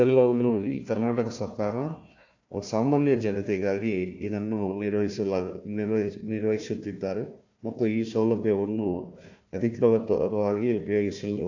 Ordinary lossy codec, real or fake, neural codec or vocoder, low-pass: AAC, 48 kbps; fake; codec, 16 kHz, 1 kbps, FunCodec, trained on Chinese and English, 50 frames a second; 7.2 kHz